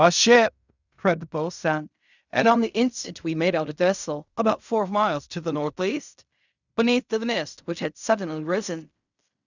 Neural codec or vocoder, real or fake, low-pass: codec, 16 kHz in and 24 kHz out, 0.4 kbps, LongCat-Audio-Codec, fine tuned four codebook decoder; fake; 7.2 kHz